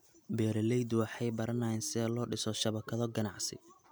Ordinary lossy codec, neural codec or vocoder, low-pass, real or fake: none; none; none; real